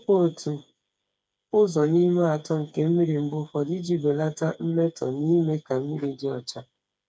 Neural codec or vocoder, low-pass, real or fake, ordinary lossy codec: codec, 16 kHz, 4 kbps, FreqCodec, smaller model; none; fake; none